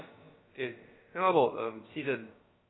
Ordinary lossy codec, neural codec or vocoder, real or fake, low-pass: AAC, 16 kbps; codec, 16 kHz, about 1 kbps, DyCAST, with the encoder's durations; fake; 7.2 kHz